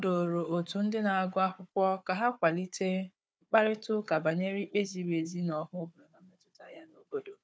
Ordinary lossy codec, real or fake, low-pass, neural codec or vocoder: none; fake; none; codec, 16 kHz, 4 kbps, FunCodec, trained on Chinese and English, 50 frames a second